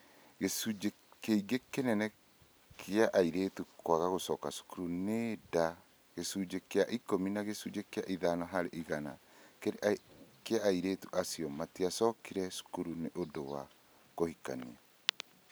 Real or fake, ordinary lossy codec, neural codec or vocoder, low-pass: real; none; none; none